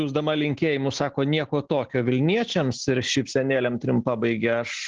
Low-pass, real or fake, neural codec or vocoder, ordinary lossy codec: 7.2 kHz; real; none; Opus, 16 kbps